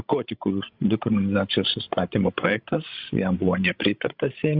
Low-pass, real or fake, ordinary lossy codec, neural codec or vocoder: 5.4 kHz; fake; Opus, 64 kbps; codec, 16 kHz, 16 kbps, FreqCodec, larger model